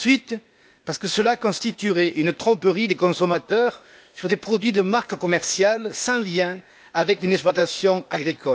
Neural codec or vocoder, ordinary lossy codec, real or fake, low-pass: codec, 16 kHz, 0.8 kbps, ZipCodec; none; fake; none